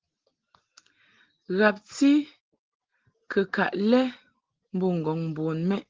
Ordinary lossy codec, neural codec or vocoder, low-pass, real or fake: Opus, 16 kbps; none; 7.2 kHz; real